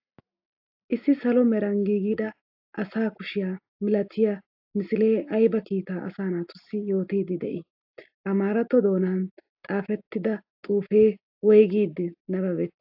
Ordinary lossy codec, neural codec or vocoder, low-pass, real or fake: AAC, 48 kbps; none; 5.4 kHz; real